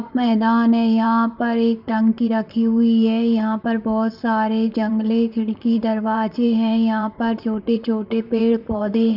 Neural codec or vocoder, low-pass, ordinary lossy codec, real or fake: vocoder, 44.1 kHz, 128 mel bands, Pupu-Vocoder; 5.4 kHz; none; fake